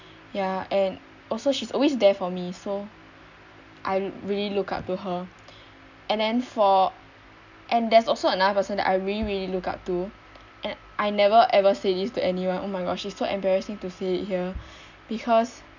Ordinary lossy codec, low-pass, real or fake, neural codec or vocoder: none; 7.2 kHz; real; none